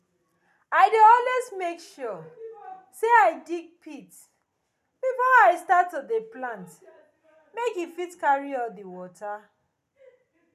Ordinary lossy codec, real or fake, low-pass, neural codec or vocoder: none; real; 14.4 kHz; none